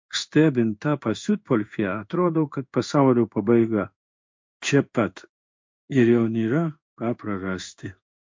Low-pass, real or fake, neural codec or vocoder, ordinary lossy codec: 7.2 kHz; fake; codec, 16 kHz in and 24 kHz out, 1 kbps, XY-Tokenizer; MP3, 48 kbps